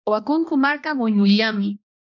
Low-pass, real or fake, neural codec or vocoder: 7.2 kHz; fake; codec, 16 kHz, 1 kbps, X-Codec, HuBERT features, trained on balanced general audio